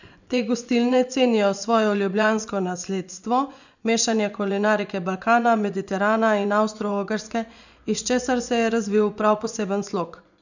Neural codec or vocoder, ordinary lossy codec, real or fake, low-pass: vocoder, 24 kHz, 100 mel bands, Vocos; none; fake; 7.2 kHz